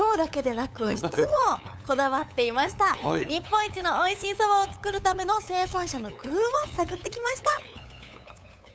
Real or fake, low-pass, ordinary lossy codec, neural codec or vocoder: fake; none; none; codec, 16 kHz, 8 kbps, FunCodec, trained on LibriTTS, 25 frames a second